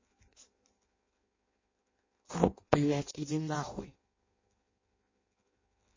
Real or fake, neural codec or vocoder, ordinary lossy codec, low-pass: fake; codec, 16 kHz in and 24 kHz out, 0.6 kbps, FireRedTTS-2 codec; MP3, 32 kbps; 7.2 kHz